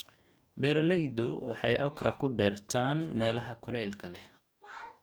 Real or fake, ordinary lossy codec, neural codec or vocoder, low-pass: fake; none; codec, 44.1 kHz, 2.6 kbps, DAC; none